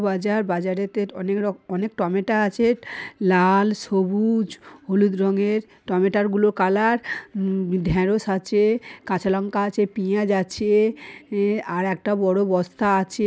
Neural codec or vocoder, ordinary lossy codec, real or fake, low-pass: none; none; real; none